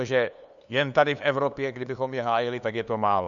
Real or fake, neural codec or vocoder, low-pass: fake; codec, 16 kHz, 2 kbps, FunCodec, trained on LibriTTS, 25 frames a second; 7.2 kHz